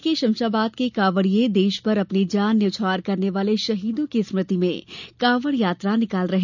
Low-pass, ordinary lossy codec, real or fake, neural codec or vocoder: 7.2 kHz; none; real; none